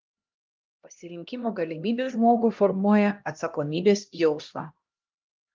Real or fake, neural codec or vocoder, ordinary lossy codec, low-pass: fake; codec, 16 kHz, 1 kbps, X-Codec, HuBERT features, trained on LibriSpeech; Opus, 24 kbps; 7.2 kHz